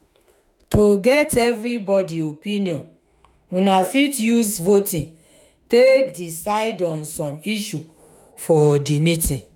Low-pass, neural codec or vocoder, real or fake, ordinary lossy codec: 19.8 kHz; autoencoder, 48 kHz, 32 numbers a frame, DAC-VAE, trained on Japanese speech; fake; none